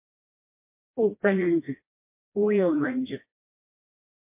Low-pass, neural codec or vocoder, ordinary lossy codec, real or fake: 3.6 kHz; codec, 16 kHz, 1 kbps, FreqCodec, smaller model; MP3, 24 kbps; fake